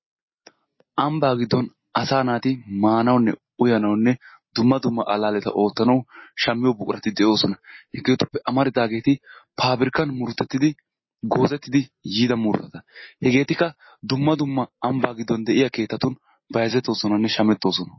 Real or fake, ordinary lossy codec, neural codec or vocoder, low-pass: real; MP3, 24 kbps; none; 7.2 kHz